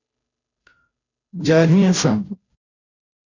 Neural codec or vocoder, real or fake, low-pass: codec, 16 kHz, 0.5 kbps, FunCodec, trained on Chinese and English, 25 frames a second; fake; 7.2 kHz